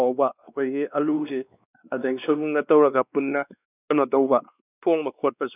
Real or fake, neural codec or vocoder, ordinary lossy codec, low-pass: fake; codec, 16 kHz, 2 kbps, X-Codec, WavLM features, trained on Multilingual LibriSpeech; none; 3.6 kHz